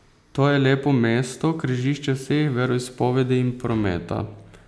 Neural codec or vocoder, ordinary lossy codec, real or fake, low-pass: none; none; real; none